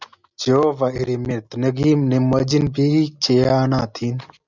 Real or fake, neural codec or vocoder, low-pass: real; none; 7.2 kHz